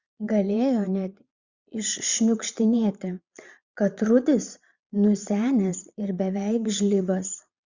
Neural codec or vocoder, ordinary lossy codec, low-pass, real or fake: vocoder, 22.05 kHz, 80 mel bands, Vocos; Opus, 64 kbps; 7.2 kHz; fake